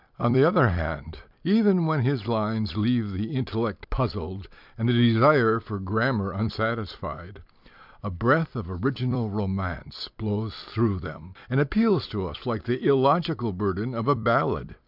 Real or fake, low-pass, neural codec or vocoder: fake; 5.4 kHz; vocoder, 44.1 kHz, 128 mel bands every 256 samples, BigVGAN v2